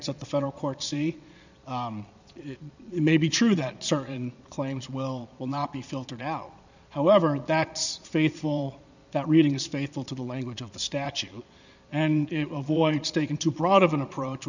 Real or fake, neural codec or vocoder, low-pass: fake; vocoder, 22.05 kHz, 80 mel bands, Vocos; 7.2 kHz